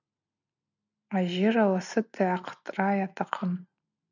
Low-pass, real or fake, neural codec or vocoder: 7.2 kHz; real; none